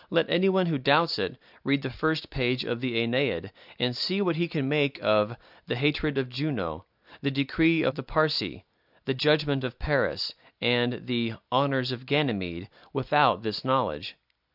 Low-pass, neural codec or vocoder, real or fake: 5.4 kHz; none; real